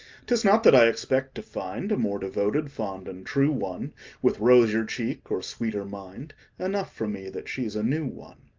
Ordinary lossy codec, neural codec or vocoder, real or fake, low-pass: Opus, 32 kbps; none; real; 7.2 kHz